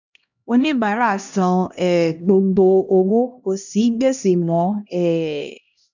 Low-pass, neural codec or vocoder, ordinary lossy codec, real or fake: 7.2 kHz; codec, 16 kHz, 1 kbps, X-Codec, HuBERT features, trained on LibriSpeech; none; fake